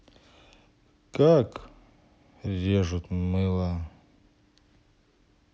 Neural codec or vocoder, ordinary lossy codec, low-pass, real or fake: none; none; none; real